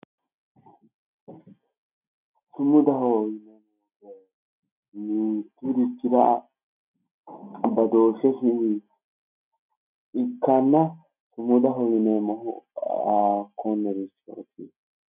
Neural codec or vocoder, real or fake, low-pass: none; real; 3.6 kHz